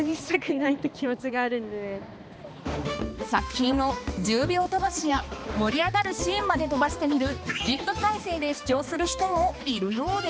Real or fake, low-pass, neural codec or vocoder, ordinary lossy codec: fake; none; codec, 16 kHz, 2 kbps, X-Codec, HuBERT features, trained on balanced general audio; none